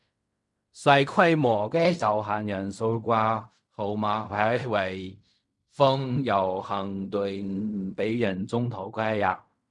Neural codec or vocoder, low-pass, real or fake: codec, 16 kHz in and 24 kHz out, 0.4 kbps, LongCat-Audio-Codec, fine tuned four codebook decoder; 10.8 kHz; fake